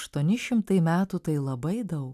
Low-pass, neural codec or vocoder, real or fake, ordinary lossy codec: 14.4 kHz; none; real; AAC, 96 kbps